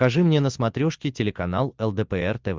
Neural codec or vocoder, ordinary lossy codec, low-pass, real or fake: none; Opus, 32 kbps; 7.2 kHz; real